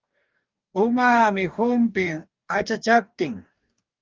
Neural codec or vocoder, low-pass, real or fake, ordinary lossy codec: codec, 44.1 kHz, 2.6 kbps, DAC; 7.2 kHz; fake; Opus, 32 kbps